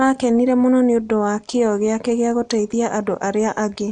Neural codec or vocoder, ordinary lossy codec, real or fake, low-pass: none; none; real; 9.9 kHz